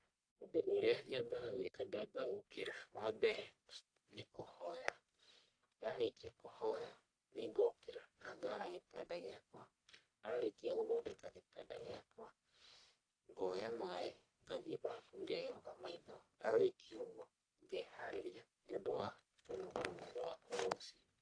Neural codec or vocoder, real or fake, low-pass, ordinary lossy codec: codec, 44.1 kHz, 1.7 kbps, Pupu-Codec; fake; 9.9 kHz; AAC, 48 kbps